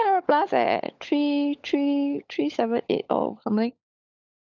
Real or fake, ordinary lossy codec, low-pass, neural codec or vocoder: fake; none; 7.2 kHz; codec, 16 kHz, 16 kbps, FunCodec, trained on LibriTTS, 50 frames a second